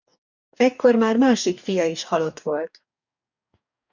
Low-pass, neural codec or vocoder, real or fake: 7.2 kHz; codec, 44.1 kHz, 2.6 kbps, DAC; fake